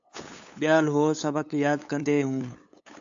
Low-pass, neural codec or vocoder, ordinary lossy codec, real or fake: 7.2 kHz; codec, 16 kHz, 8 kbps, FunCodec, trained on LibriTTS, 25 frames a second; AAC, 64 kbps; fake